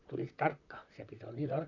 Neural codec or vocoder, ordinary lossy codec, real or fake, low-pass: vocoder, 44.1 kHz, 128 mel bands, Pupu-Vocoder; none; fake; 7.2 kHz